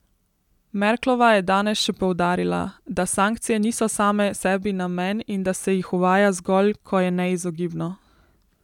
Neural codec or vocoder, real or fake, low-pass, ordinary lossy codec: none; real; 19.8 kHz; none